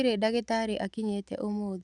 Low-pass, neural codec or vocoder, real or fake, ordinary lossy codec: 10.8 kHz; none; real; none